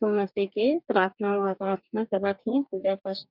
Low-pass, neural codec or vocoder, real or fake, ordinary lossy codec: 5.4 kHz; codec, 44.1 kHz, 2.6 kbps, DAC; fake; none